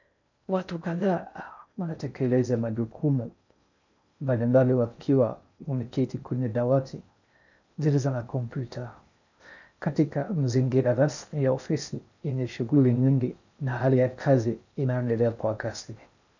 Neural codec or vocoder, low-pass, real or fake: codec, 16 kHz in and 24 kHz out, 0.6 kbps, FocalCodec, streaming, 4096 codes; 7.2 kHz; fake